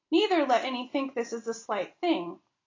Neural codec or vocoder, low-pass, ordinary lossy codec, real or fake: none; 7.2 kHz; AAC, 32 kbps; real